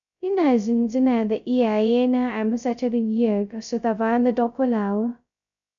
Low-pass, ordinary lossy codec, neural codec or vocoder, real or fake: 7.2 kHz; none; codec, 16 kHz, 0.2 kbps, FocalCodec; fake